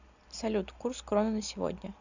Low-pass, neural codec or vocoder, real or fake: 7.2 kHz; none; real